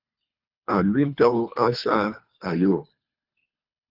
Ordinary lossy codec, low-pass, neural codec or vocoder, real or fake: Opus, 64 kbps; 5.4 kHz; codec, 24 kHz, 3 kbps, HILCodec; fake